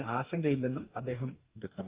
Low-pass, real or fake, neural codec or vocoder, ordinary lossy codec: 3.6 kHz; fake; codec, 44.1 kHz, 2.6 kbps, DAC; Opus, 32 kbps